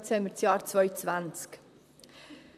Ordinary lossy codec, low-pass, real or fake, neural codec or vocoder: none; 14.4 kHz; fake; vocoder, 44.1 kHz, 128 mel bands, Pupu-Vocoder